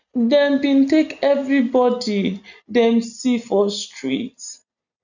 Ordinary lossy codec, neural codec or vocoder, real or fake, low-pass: none; none; real; 7.2 kHz